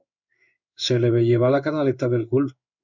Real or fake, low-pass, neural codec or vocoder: fake; 7.2 kHz; codec, 16 kHz in and 24 kHz out, 1 kbps, XY-Tokenizer